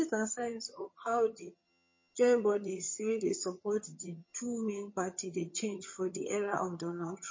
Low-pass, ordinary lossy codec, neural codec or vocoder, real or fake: 7.2 kHz; MP3, 32 kbps; vocoder, 22.05 kHz, 80 mel bands, HiFi-GAN; fake